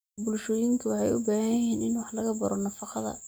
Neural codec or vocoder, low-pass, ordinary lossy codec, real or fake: none; none; none; real